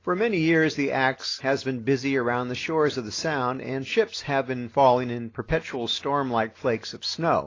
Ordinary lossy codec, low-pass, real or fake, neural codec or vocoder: AAC, 32 kbps; 7.2 kHz; real; none